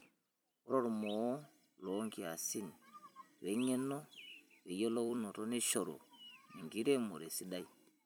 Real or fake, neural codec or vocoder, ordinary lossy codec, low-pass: fake; vocoder, 44.1 kHz, 128 mel bands every 256 samples, BigVGAN v2; none; none